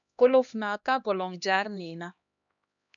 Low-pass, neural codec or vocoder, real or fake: 7.2 kHz; codec, 16 kHz, 1 kbps, X-Codec, HuBERT features, trained on LibriSpeech; fake